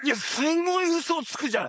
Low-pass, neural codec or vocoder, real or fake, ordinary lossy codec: none; codec, 16 kHz, 4.8 kbps, FACodec; fake; none